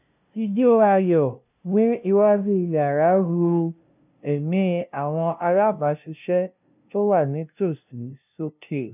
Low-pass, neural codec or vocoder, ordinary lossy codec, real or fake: 3.6 kHz; codec, 16 kHz, 0.5 kbps, FunCodec, trained on LibriTTS, 25 frames a second; none; fake